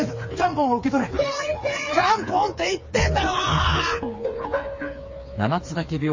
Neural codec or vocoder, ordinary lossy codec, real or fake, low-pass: codec, 16 kHz, 4 kbps, FreqCodec, smaller model; MP3, 32 kbps; fake; 7.2 kHz